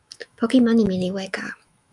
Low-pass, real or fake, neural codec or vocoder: 10.8 kHz; fake; autoencoder, 48 kHz, 128 numbers a frame, DAC-VAE, trained on Japanese speech